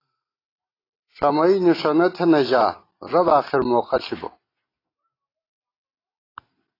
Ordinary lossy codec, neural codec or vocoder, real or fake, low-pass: AAC, 24 kbps; none; real; 5.4 kHz